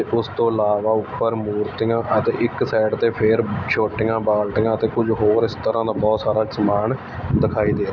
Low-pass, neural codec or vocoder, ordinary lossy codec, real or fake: 7.2 kHz; none; none; real